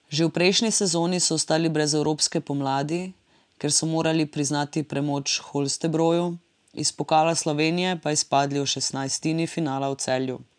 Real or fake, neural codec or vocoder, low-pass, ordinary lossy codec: real; none; 9.9 kHz; none